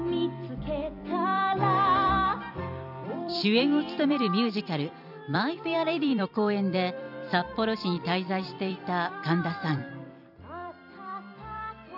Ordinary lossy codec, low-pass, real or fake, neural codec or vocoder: none; 5.4 kHz; fake; vocoder, 44.1 kHz, 128 mel bands every 256 samples, BigVGAN v2